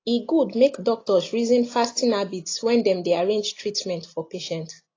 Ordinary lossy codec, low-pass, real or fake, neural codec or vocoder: AAC, 32 kbps; 7.2 kHz; real; none